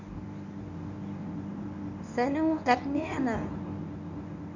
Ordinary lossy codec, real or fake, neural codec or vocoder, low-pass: none; fake; codec, 24 kHz, 0.9 kbps, WavTokenizer, medium speech release version 1; 7.2 kHz